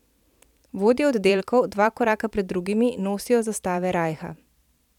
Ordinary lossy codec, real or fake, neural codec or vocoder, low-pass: none; fake; vocoder, 44.1 kHz, 128 mel bands every 512 samples, BigVGAN v2; 19.8 kHz